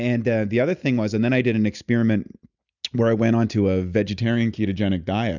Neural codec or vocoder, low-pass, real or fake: none; 7.2 kHz; real